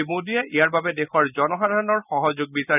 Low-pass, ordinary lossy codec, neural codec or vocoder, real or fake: 3.6 kHz; none; none; real